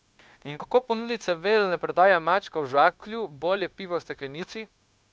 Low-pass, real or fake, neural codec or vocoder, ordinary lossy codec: none; fake; codec, 16 kHz, 0.9 kbps, LongCat-Audio-Codec; none